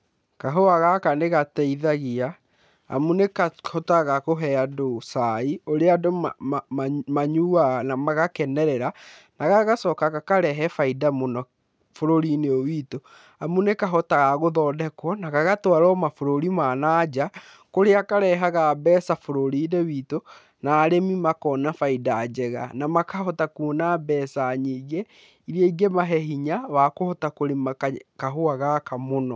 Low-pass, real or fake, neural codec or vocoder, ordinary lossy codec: none; real; none; none